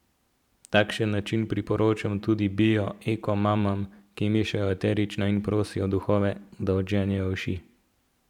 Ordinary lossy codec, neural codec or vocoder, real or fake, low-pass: none; vocoder, 44.1 kHz, 128 mel bands every 512 samples, BigVGAN v2; fake; 19.8 kHz